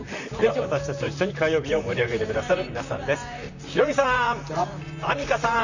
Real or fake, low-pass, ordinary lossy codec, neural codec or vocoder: fake; 7.2 kHz; none; vocoder, 44.1 kHz, 128 mel bands, Pupu-Vocoder